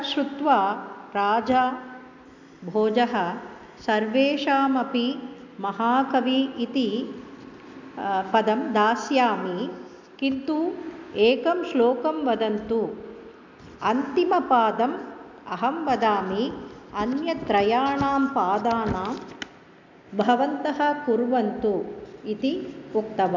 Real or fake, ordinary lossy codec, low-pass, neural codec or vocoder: real; MP3, 64 kbps; 7.2 kHz; none